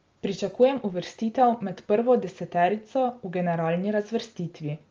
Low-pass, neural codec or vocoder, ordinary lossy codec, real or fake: 7.2 kHz; none; Opus, 32 kbps; real